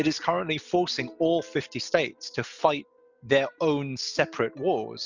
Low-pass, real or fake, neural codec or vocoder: 7.2 kHz; real; none